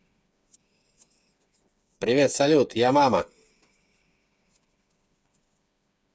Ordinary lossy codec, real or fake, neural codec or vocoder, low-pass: none; fake; codec, 16 kHz, 8 kbps, FreqCodec, smaller model; none